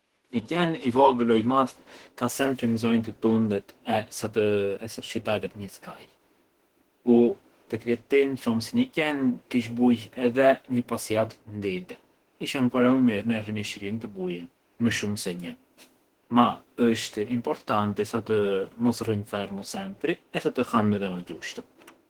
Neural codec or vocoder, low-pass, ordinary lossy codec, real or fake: autoencoder, 48 kHz, 32 numbers a frame, DAC-VAE, trained on Japanese speech; 19.8 kHz; Opus, 16 kbps; fake